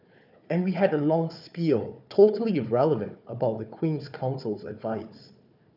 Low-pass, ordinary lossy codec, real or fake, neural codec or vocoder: 5.4 kHz; none; fake; codec, 16 kHz, 4 kbps, FunCodec, trained on Chinese and English, 50 frames a second